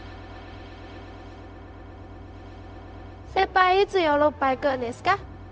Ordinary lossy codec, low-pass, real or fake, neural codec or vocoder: none; none; fake; codec, 16 kHz, 0.4 kbps, LongCat-Audio-Codec